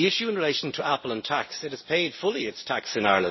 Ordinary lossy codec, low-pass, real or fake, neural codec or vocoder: MP3, 24 kbps; 7.2 kHz; real; none